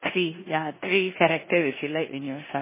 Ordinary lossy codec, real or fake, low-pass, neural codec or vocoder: MP3, 16 kbps; fake; 3.6 kHz; codec, 16 kHz in and 24 kHz out, 0.9 kbps, LongCat-Audio-Codec, four codebook decoder